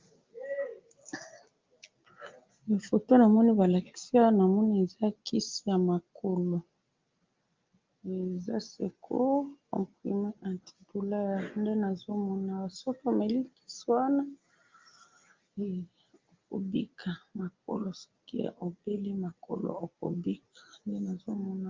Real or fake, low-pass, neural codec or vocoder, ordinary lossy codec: real; 7.2 kHz; none; Opus, 24 kbps